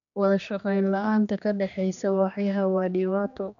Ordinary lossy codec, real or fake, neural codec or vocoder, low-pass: none; fake; codec, 16 kHz, 2 kbps, X-Codec, HuBERT features, trained on general audio; 7.2 kHz